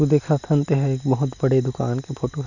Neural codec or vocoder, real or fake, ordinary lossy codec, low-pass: none; real; none; 7.2 kHz